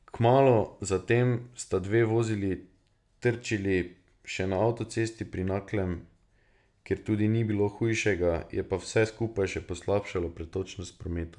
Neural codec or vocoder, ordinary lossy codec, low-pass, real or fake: none; none; 10.8 kHz; real